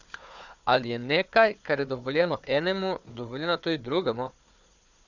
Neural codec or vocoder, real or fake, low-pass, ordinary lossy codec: codec, 16 kHz, 4 kbps, FunCodec, trained on Chinese and English, 50 frames a second; fake; 7.2 kHz; none